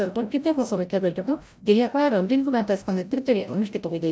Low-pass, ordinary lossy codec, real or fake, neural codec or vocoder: none; none; fake; codec, 16 kHz, 0.5 kbps, FreqCodec, larger model